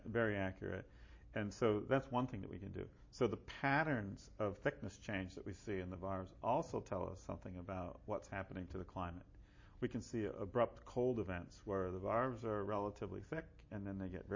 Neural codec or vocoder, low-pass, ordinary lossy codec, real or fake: none; 7.2 kHz; MP3, 32 kbps; real